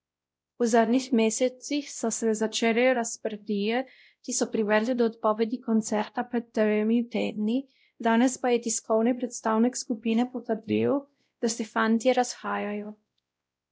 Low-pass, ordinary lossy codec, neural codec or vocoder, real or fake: none; none; codec, 16 kHz, 0.5 kbps, X-Codec, WavLM features, trained on Multilingual LibriSpeech; fake